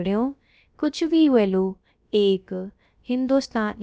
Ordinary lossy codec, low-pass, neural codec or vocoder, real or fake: none; none; codec, 16 kHz, about 1 kbps, DyCAST, with the encoder's durations; fake